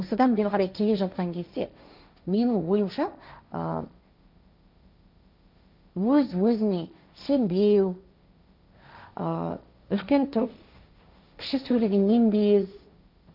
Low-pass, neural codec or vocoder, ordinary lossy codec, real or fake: 5.4 kHz; codec, 16 kHz, 1.1 kbps, Voila-Tokenizer; none; fake